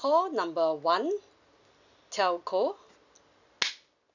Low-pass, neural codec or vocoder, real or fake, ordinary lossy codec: 7.2 kHz; none; real; none